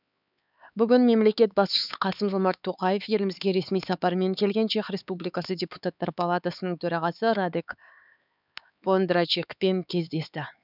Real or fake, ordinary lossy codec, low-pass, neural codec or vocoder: fake; none; 5.4 kHz; codec, 16 kHz, 4 kbps, X-Codec, HuBERT features, trained on LibriSpeech